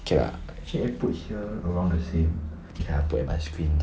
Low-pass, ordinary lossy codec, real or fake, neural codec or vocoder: none; none; real; none